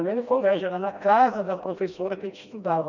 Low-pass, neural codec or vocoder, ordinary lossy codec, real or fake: 7.2 kHz; codec, 16 kHz, 1 kbps, FreqCodec, smaller model; none; fake